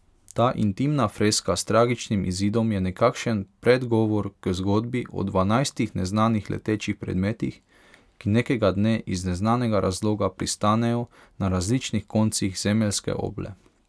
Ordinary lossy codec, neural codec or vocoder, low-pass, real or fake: none; none; none; real